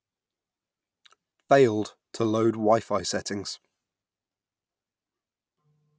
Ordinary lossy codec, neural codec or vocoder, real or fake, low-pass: none; none; real; none